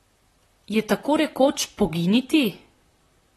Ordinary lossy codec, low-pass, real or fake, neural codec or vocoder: AAC, 32 kbps; 19.8 kHz; fake; vocoder, 44.1 kHz, 128 mel bands, Pupu-Vocoder